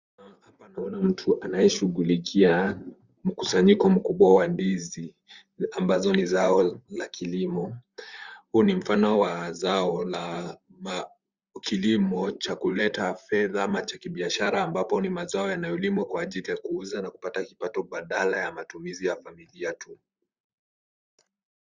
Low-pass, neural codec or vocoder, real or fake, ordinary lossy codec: 7.2 kHz; vocoder, 44.1 kHz, 128 mel bands, Pupu-Vocoder; fake; Opus, 64 kbps